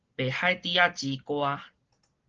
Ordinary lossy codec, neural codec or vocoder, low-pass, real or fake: Opus, 16 kbps; none; 7.2 kHz; real